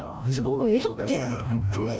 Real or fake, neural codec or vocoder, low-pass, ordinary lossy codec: fake; codec, 16 kHz, 0.5 kbps, FreqCodec, larger model; none; none